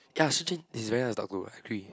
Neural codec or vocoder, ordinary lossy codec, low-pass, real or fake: none; none; none; real